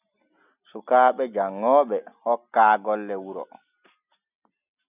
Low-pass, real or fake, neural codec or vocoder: 3.6 kHz; real; none